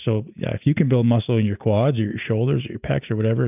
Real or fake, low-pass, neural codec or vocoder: real; 3.6 kHz; none